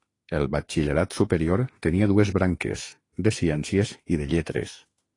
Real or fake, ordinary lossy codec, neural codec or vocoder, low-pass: fake; AAC, 32 kbps; autoencoder, 48 kHz, 32 numbers a frame, DAC-VAE, trained on Japanese speech; 10.8 kHz